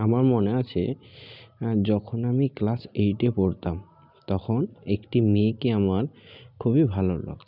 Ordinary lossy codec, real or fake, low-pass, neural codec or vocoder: none; real; 5.4 kHz; none